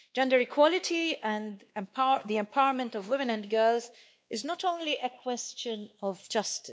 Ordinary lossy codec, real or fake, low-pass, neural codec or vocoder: none; fake; none; codec, 16 kHz, 2 kbps, X-Codec, WavLM features, trained on Multilingual LibriSpeech